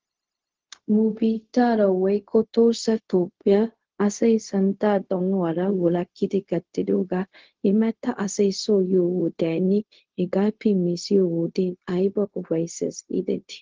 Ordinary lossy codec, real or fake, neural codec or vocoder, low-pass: Opus, 16 kbps; fake; codec, 16 kHz, 0.4 kbps, LongCat-Audio-Codec; 7.2 kHz